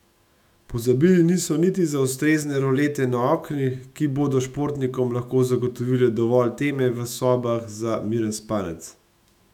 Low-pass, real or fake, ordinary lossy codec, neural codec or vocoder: 19.8 kHz; fake; none; autoencoder, 48 kHz, 128 numbers a frame, DAC-VAE, trained on Japanese speech